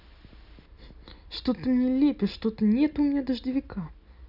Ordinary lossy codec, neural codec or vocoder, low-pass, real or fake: none; none; 5.4 kHz; real